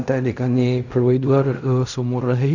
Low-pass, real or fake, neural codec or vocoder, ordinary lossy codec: 7.2 kHz; fake; codec, 16 kHz in and 24 kHz out, 0.4 kbps, LongCat-Audio-Codec, fine tuned four codebook decoder; none